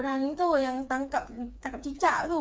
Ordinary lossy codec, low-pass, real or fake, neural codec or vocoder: none; none; fake; codec, 16 kHz, 4 kbps, FreqCodec, smaller model